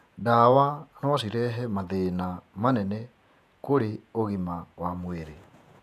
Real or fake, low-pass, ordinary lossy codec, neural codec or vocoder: fake; 14.4 kHz; none; vocoder, 48 kHz, 128 mel bands, Vocos